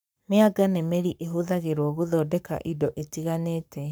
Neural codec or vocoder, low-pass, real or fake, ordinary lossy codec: codec, 44.1 kHz, 7.8 kbps, Pupu-Codec; none; fake; none